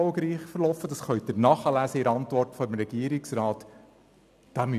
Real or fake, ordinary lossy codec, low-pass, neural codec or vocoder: real; none; 14.4 kHz; none